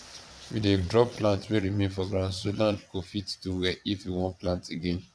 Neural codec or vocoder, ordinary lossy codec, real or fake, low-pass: vocoder, 22.05 kHz, 80 mel bands, Vocos; none; fake; none